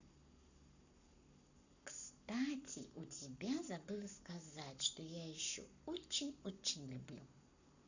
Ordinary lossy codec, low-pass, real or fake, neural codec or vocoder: none; 7.2 kHz; fake; codec, 44.1 kHz, 7.8 kbps, Pupu-Codec